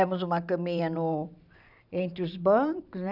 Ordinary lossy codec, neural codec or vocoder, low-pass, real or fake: none; vocoder, 44.1 kHz, 128 mel bands every 512 samples, BigVGAN v2; 5.4 kHz; fake